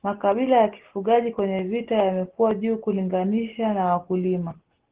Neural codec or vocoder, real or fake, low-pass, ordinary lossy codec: none; real; 3.6 kHz; Opus, 16 kbps